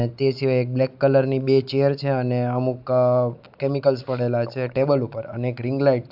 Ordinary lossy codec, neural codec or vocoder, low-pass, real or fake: AAC, 48 kbps; none; 5.4 kHz; real